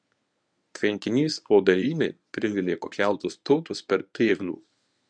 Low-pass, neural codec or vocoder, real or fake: 9.9 kHz; codec, 24 kHz, 0.9 kbps, WavTokenizer, medium speech release version 1; fake